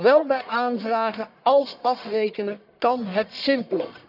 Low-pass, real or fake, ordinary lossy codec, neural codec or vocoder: 5.4 kHz; fake; none; codec, 44.1 kHz, 1.7 kbps, Pupu-Codec